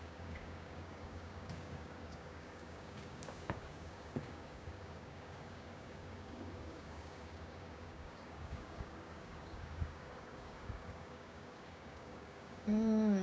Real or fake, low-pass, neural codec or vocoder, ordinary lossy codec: fake; none; codec, 16 kHz, 6 kbps, DAC; none